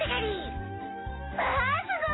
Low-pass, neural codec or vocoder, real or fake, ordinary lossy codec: 7.2 kHz; none; real; AAC, 16 kbps